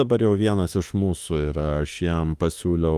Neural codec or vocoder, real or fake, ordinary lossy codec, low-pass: autoencoder, 48 kHz, 32 numbers a frame, DAC-VAE, trained on Japanese speech; fake; Opus, 32 kbps; 14.4 kHz